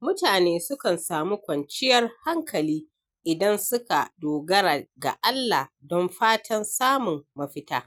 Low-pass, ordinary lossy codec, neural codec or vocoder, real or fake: none; none; none; real